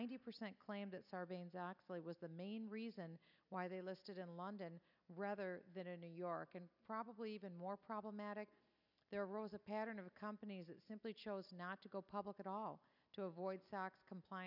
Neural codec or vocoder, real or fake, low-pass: none; real; 5.4 kHz